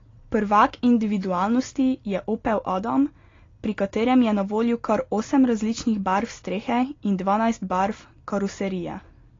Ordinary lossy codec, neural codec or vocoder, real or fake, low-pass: AAC, 32 kbps; none; real; 7.2 kHz